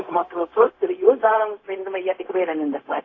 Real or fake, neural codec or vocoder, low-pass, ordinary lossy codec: fake; codec, 16 kHz, 0.4 kbps, LongCat-Audio-Codec; 7.2 kHz; AAC, 32 kbps